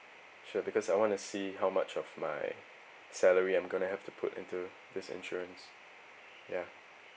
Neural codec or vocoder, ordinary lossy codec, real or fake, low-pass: none; none; real; none